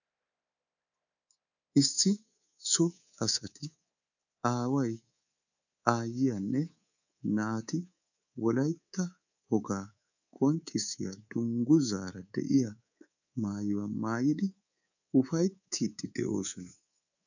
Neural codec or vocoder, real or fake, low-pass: codec, 24 kHz, 3.1 kbps, DualCodec; fake; 7.2 kHz